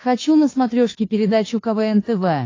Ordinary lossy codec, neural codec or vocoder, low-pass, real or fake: AAC, 32 kbps; codec, 16 kHz, 2 kbps, FunCodec, trained on Chinese and English, 25 frames a second; 7.2 kHz; fake